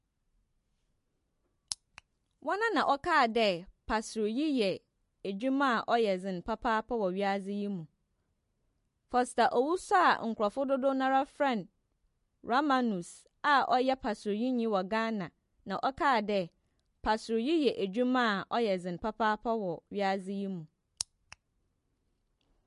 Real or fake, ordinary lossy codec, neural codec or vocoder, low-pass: real; MP3, 48 kbps; none; 14.4 kHz